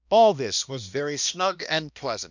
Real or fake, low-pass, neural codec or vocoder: fake; 7.2 kHz; codec, 16 kHz, 1 kbps, X-Codec, HuBERT features, trained on balanced general audio